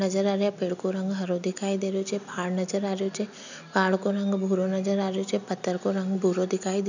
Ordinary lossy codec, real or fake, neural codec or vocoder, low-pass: none; real; none; 7.2 kHz